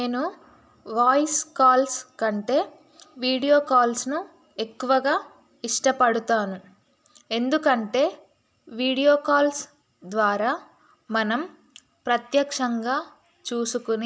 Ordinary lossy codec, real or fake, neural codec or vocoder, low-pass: none; real; none; none